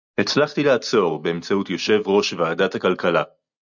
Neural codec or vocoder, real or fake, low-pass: none; real; 7.2 kHz